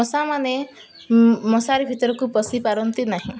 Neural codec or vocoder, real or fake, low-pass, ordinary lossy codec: none; real; none; none